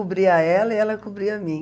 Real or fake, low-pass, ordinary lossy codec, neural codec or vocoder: real; none; none; none